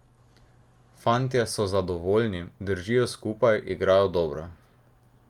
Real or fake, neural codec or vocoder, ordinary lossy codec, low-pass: real; none; Opus, 32 kbps; 19.8 kHz